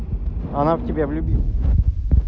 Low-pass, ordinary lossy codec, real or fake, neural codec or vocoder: none; none; real; none